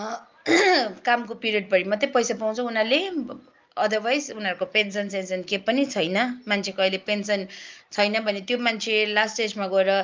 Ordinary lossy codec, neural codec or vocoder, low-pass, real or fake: Opus, 24 kbps; none; 7.2 kHz; real